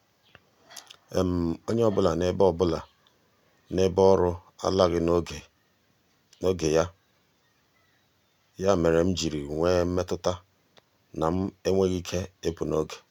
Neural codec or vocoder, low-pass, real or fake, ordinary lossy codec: none; 19.8 kHz; real; none